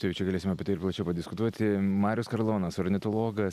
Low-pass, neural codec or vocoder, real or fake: 14.4 kHz; none; real